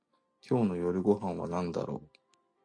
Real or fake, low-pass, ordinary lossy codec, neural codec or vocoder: real; 9.9 kHz; AAC, 48 kbps; none